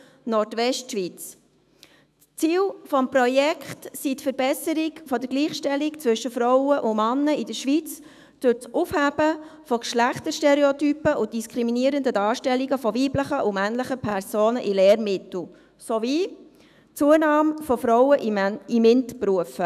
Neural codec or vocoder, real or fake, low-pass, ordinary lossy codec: autoencoder, 48 kHz, 128 numbers a frame, DAC-VAE, trained on Japanese speech; fake; 14.4 kHz; none